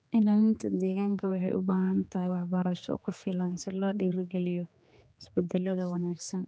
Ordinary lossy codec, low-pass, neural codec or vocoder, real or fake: none; none; codec, 16 kHz, 2 kbps, X-Codec, HuBERT features, trained on general audio; fake